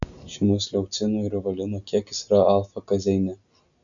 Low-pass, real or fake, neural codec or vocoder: 7.2 kHz; real; none